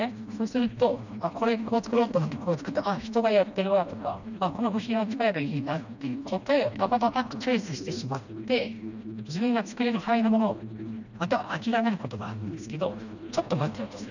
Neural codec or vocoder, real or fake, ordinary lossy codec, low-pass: codec, 16 kHz, 1 kbps, FreqCodec, smaller model; fake; none; 7.2 kHz